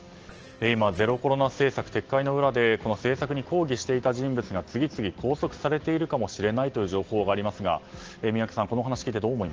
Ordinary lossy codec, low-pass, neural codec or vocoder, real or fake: Opus, 16 kbps; 7.2 kHz; none; real